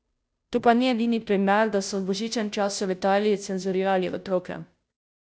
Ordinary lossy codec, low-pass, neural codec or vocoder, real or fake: none; none; codec, 16 kHz, 0.5 kbps, FunCodec, trained on Chinese and English, 25 frames a second; fake